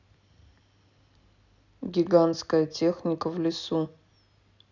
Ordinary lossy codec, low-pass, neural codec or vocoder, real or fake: none; 7.2 kHz; none; real